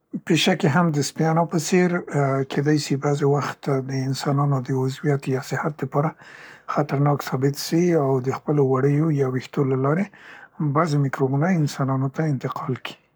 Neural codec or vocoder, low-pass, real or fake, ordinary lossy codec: codec, 44.1 kHz, 7.8 kbps, Pupu-Codec; none; fake; none